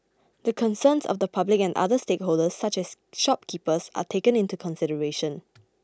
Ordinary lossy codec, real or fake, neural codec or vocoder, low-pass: none; real; none; none